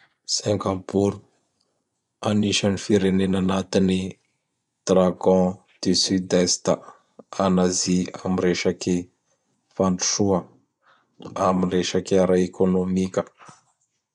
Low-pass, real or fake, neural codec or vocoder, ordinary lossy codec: 10.8 kHz; fake; vocoder, 24 kHz, 100 mel bands, Vocos; none